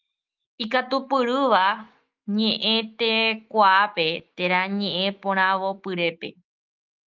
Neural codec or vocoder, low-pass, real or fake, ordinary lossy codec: autoencoder, 48 kHz, 128 numbers a frame, DAC-VAE, trained on Japanese speech; 7.2 kHz; fake; Opus, 32 kbps